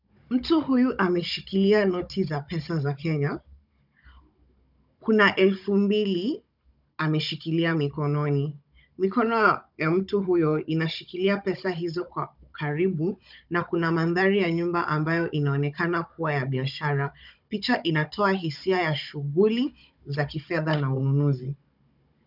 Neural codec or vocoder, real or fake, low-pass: codec, 16 kHz, 16 kbps, FunCodec, trained on Chinese and English, 50 frames a second; fake; 5.4 kHz